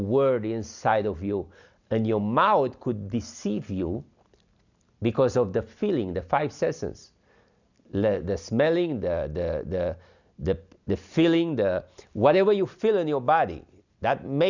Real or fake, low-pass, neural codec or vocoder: real; 7.2 kHz; none